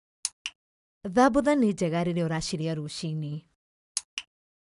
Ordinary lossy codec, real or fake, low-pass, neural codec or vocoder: none; real; 10.8 kHz; none